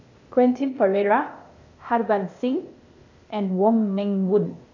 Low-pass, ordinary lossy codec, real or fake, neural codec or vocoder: 7.2 kHz; AAC, 48 kbps; fake; codec, 16 kHz, 0.8 kbps, ZipCodec